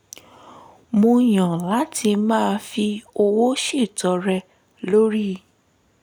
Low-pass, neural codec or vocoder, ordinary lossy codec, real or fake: 19.8 kHz; none; none; real